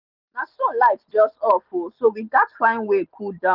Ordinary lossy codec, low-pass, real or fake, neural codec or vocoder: Opus, 24 kbps; 5.4 kHz; real; none